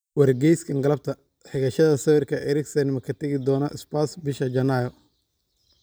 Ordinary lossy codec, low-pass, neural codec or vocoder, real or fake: none; none; none; real